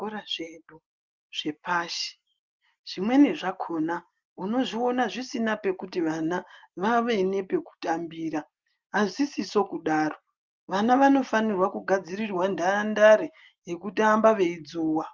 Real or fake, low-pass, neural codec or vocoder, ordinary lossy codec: real; 7.2 kHz; none; Opus, 24 kbps